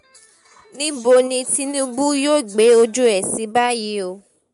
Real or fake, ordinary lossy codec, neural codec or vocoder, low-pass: real; MP3, 64 kbps; none; 10.8 kHz